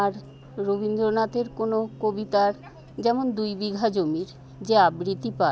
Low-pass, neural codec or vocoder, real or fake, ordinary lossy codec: none; none; real; none